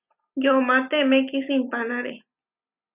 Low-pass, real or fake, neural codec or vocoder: 3.6 kHz; real; none